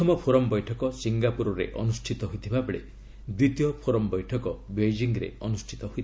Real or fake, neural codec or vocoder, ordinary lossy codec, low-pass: real; none; none; none